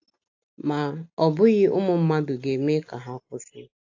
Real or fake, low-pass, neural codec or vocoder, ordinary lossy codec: real; 7.2 kHz; none; AAC, 48 kbps